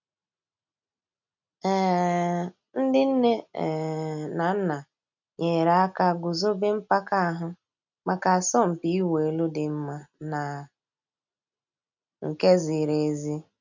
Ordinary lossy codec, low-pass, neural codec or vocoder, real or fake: none; 7.2 kHz; none; real